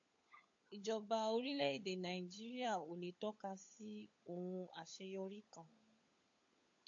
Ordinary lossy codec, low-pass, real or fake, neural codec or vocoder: AAC, 48 kbps; 7.2 kHz; fake; codec, 16 kHz, 16 kbps, FunCodec, trained on LibriTTS, 50 frames a second